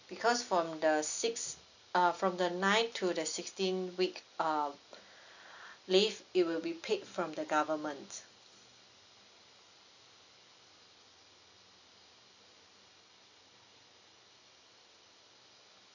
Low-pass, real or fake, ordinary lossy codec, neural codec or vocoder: 7.2 kHz; real; none; none